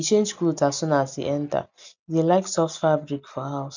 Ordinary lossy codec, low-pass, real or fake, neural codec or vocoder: none; 7.2 kHz; real; none